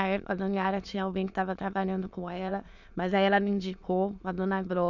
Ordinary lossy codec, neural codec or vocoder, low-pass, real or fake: none; autoencoder, 22.05 kHz, a latent of 192 numbers a frame, VITS, trained on many speakers; 7.2 kHz; fake